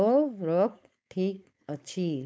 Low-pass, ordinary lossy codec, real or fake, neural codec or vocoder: none; none; fake; codec, 16 kHz, 4.8 kbps, FACodec